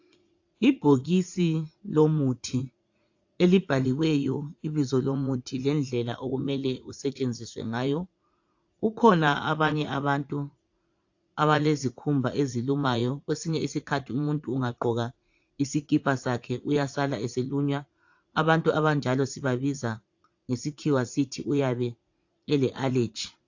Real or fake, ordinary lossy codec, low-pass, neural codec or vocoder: fake; AAC, 48 kbps; 7.2 kHz; vocoder, 44.1 kHz, 80 mel bands, Vocos